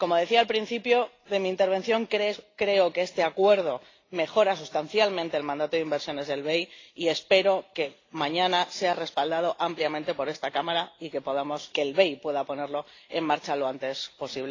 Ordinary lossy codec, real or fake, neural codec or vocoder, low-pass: AAC, 32 kbps; real; none; 7.2 kHz